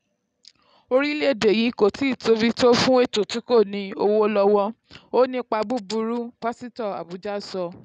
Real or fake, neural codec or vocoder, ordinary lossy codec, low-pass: real; none; none; 9.9 kHz